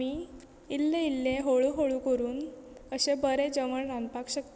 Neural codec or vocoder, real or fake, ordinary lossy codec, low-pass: none; real; none; none